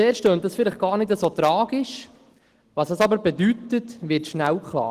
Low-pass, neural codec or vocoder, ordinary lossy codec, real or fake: 14.4 kHz; none; Opus, 16 kbps; real